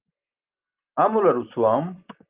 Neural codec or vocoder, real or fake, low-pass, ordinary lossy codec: none; real; 3.6 kHz; Opus, 24 kbps